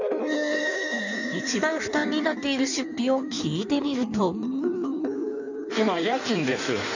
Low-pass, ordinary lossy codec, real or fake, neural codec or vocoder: 7.2 kHz; none; fake; codec, 16 kHz in and 24 kHz out, 1.1 kbps, FireRedTTS-2 codec